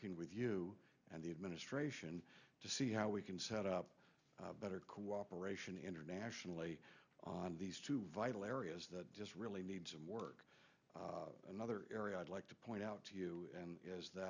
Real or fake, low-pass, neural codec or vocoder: real; 7.2 kHz; none